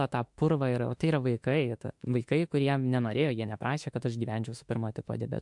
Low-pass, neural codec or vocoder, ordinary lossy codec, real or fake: 10.8 kHz; autoencoder, 48 kHz, 32 numbers a frame, DAC-VAE, trained on Japanese speech; MP3, 64 kbps; fake